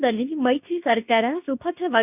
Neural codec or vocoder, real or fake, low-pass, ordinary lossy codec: codec, 24 kHz, 0.9 kbps, WavTokenizer, medium speech release version 2; fake; 3.6 kHz; none